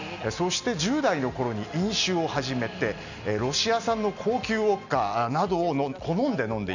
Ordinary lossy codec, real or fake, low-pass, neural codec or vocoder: none; real; 7.2 kHz; none